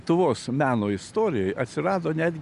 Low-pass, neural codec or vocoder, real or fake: 10.8 kHz; none; real